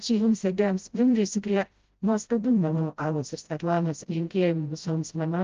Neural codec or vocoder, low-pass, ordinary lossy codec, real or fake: codec, 16 kHz, 0.5 kbps, FreqCodec, smaller model; 7.2 kHz; Opus, 24 kbps; fake